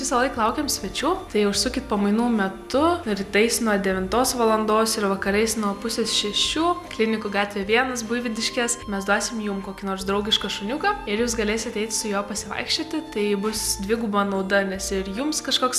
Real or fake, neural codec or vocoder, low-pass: real; none; 14.4 kHz